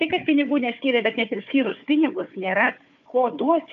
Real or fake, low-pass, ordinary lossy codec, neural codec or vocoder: fake; 7.2 kHz; AAC, 96 kbps; codec, 16 kHz, 4 kbps, FunCodec, trained on Chinese and English, 50 frames a second